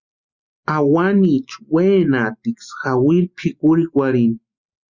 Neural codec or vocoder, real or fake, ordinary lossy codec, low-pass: none; real; Opus, 64 kbps; 7.2 kHz